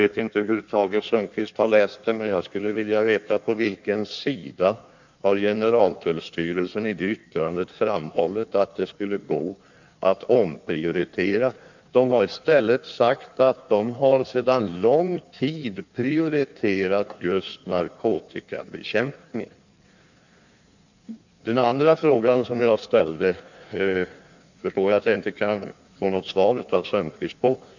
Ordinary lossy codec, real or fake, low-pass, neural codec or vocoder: none; fake; 7.2 kHz; codec, 16 kHz in and 24 kHz out, 1.1 kbps, FireRedTTS-2 codec